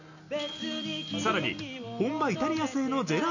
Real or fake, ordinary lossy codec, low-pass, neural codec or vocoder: real; none; 7.2 kHz; none